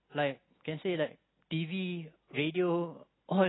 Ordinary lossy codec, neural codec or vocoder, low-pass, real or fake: AAC, 16 kbps; none; 7.2 kHz; real